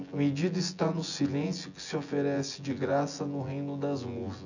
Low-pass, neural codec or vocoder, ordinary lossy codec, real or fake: 7.2 kHz; vocoder, 24 kHz, 100 mel bands, Vocos; none; fake